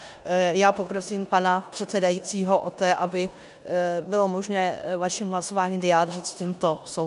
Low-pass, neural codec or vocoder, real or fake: 10.8 kHz; codec, 16 kHz in and 24 kHz out, 0.9 kbps, LongCat-Audio-Codec, four codebook decoder; fake